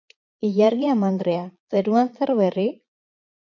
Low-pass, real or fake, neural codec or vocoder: 7.2 kHz; fake; vocoder, 22.05 kHz, 80 mel bands, Vocos